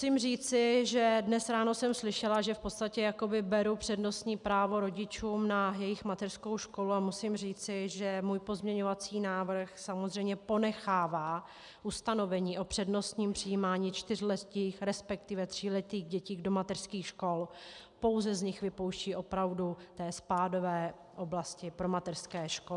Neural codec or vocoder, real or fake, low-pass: none; real; 10.8 kHz